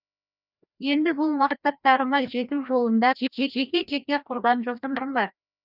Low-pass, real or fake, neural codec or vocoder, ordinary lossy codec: 5.4 kHz; fake; codec, 16 kHz, 1 kbps, FreqCodec, larger model; none